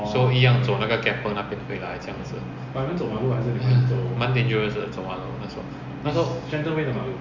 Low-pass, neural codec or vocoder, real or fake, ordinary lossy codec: 7.2 kHz; none; real; Opus, 64 kbps